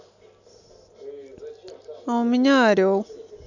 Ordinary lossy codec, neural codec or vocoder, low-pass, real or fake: none; none; 7.2 kHz; real